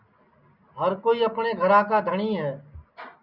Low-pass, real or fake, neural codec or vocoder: 5.4 kHz; real; none